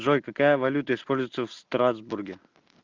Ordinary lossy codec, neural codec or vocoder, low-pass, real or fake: Opus, 24 kbps; none; 7.2 kHz; real